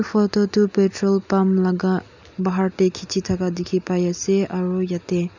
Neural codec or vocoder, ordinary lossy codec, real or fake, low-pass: none; none; real; 7.2 kHz